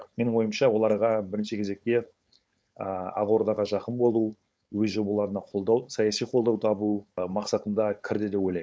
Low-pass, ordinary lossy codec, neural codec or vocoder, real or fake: none; none; codec, 16 kHz, 4.8 kbps, FACodec; fake